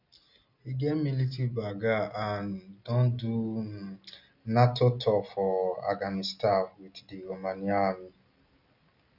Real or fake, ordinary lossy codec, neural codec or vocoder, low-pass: real; none; none; 5.4 kHz